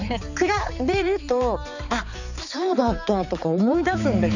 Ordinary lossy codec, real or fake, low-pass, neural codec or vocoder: none; fake; 7.2 kHz; codec, 16 kHz, 4 kbps, X-Codec, HuBERT features, trained on balanced general audio